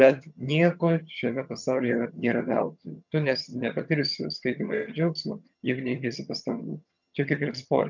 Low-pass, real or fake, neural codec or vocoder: 7.2 kHz; fake; vocoder, 22.05 kHz, 80 mel bands, HiFi-GAN